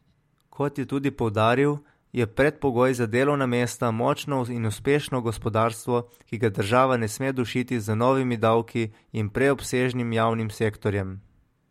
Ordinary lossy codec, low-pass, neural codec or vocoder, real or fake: MP3, 64 kbps; 19.8 kHz; none; real